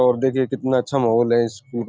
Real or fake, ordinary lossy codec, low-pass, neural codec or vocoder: real; none; none; none